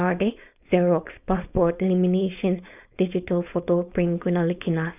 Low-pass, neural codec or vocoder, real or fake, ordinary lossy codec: 3.6 kHz; codec, 16 kHz, 4.8 kbps, FACodec; fake; none